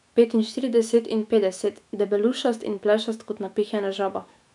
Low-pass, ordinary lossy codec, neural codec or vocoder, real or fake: 10.8 kHz; none; autoencoder, 48 kHz, 128 numbers a frame, DAC-VAE, trained on Japanese speech; fake